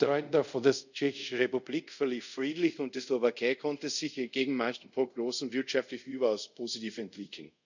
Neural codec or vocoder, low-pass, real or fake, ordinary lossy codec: codec, 24 kHz, 0.5 kbps, DualCodec; 7.2 kHz; fake; none